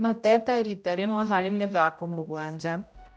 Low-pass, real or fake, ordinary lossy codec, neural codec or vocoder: none; fake; none; codec, 16 kHz, 0.5 kbps, X-Codec, HuBERT features, trained on general audio